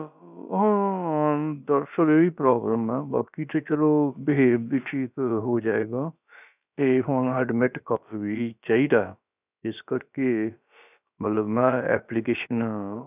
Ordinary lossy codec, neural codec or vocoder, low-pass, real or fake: none; codec, 16 kHz, about 1 kbps, DyCAST, with the encoder's durations; 3.6 kHz; fake